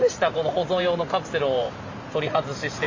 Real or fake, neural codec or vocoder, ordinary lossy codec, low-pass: fake; vocoder, 44.1 kHz, 128 mel bands every 512 samples, BigVGAN v2; MP3, 64 kbps; 7.2 kHz